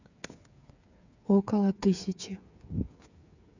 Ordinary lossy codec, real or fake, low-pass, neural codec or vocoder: none; fake; 7.2 kHz; codec, 16 kHz, 8 kbps, FreqCodec, smaller model